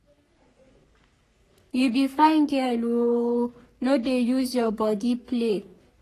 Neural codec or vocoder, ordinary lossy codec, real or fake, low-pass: codec, 44.1 kHz, 3.4 kbps, Pupu-Codec; AAC, 48 kbps; fake; 14.4 kHz